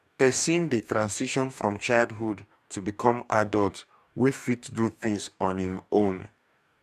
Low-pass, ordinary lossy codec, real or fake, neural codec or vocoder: 14.4 kHz; none; fake; codec, 44.1 kHz, 2.6 kbps, DAC